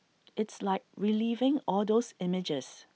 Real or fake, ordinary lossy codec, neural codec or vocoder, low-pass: real; none; none; none